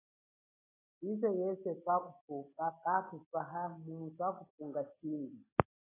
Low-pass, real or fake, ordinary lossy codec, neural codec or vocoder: 3.6 kHz; real; AAC, 16 kbps; none